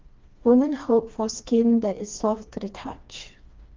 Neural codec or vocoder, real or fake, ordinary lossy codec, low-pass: codec, 16 kHz, 2 kbps, FreqCodec, smaller model; fake; Opus, 32 kbps; 7.2 kHz